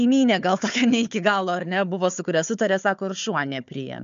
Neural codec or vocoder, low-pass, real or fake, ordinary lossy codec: codec, 16 kHz, 4 kbps, FunCodec, trained on Chinese and English, 50 frames a second; 7.2 kHz; fake; MP3, 64 kbps